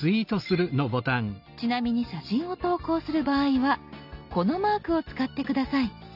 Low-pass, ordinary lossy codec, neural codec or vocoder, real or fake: 5.4 kHz; none; none; real